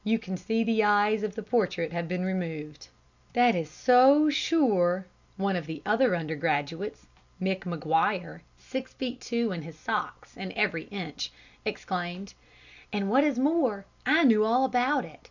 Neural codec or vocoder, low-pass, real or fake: none; 7.2 kHz; real